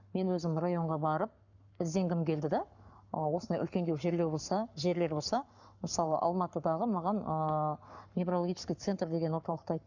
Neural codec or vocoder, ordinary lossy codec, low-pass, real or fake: codec, 44.1 kHz, 7.8 kbps, Pupu-Codec; none; 7.2 kHz; fake